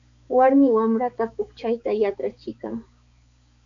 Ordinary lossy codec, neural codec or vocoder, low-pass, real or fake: MP3, 64 kbps; codec, 16 kHz, 4 kbps, X-Codec, HuBERT features, trained on balanced general audio; 7.2 kHz; fake